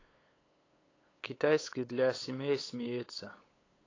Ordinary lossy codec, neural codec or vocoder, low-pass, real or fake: AAC, 32 kbps; codec, 16 kHz, 8 kbps, FunCodec, trained on LibriTTS, 25 frames a second; 7.2 kHz; fake